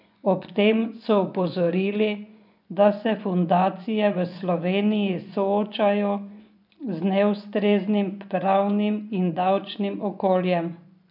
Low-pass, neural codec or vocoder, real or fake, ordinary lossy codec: 5.4 kHz; vocoder, 24 kHz, 100 mel bands, Vocos; fake; none